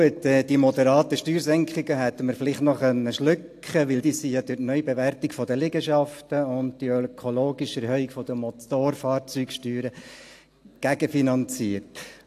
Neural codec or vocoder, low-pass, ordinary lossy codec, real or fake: none; 14.4 kHz; AAC, 64 kbps; real